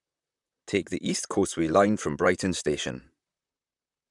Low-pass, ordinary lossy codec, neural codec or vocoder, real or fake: 10.8 kHz; none; vocoder, 44.1 kHz, 128 mel bands, Pupu-Vocoder; fake